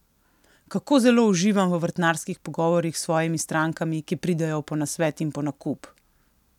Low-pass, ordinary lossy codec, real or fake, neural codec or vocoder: 19.8 kHz; none; real; none